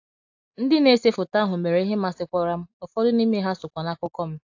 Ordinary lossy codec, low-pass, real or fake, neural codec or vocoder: AAC, 48 kbps; 7.2 kHz; real; none